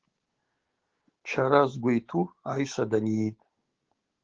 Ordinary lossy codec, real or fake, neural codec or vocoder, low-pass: Opus, 16 kbps; fake; codec, 16 kHz, 6 kbps, DAC; 7.2 kHz